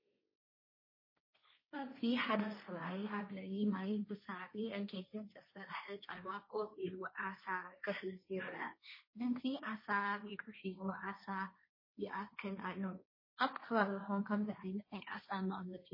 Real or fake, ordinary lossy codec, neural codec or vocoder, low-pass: fake; MP3, 24 kbps; codec, 16 kHz, 1.1 kbps, Voila-Tokenizer; 5.4 kHz